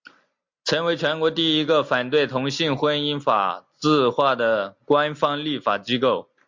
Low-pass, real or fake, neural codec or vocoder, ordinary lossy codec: 7.2 kHz; real; none; MP3, 64 kbps